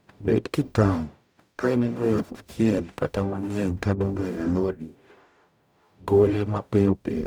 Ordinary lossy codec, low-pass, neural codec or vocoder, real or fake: none; none; codec, 44.1 kHz, 0.9 kbps, DAC; fake